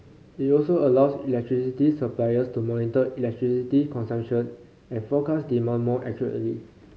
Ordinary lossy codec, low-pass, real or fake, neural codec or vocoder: none; none; real; none